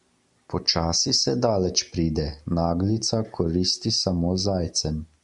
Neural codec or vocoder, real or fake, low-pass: none; real; 10.8 kHz